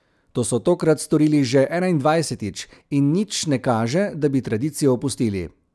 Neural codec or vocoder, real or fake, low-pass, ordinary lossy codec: none; real; none; none